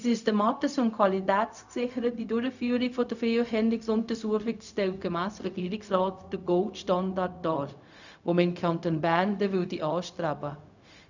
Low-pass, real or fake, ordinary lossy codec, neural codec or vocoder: 7.2 kHz; fake; none; codec, 16 kHz, 0.4 kbps, LongCat-Audio-Codec